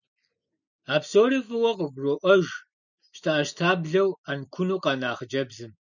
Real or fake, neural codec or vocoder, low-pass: real; none; 7.2 kHz